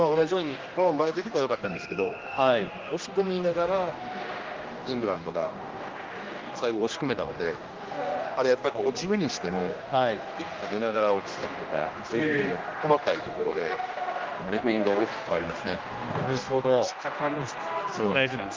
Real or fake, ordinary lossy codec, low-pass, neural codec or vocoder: fake; Opus, 32 kbps; 7.2 kHz; codec, 16 kHz, 1 kbps, X-Codec, HuBERT features, trained on general audio